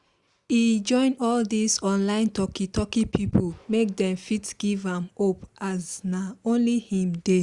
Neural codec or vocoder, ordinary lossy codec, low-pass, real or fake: none; Opus, 64 kbps; 10.8 kHz; real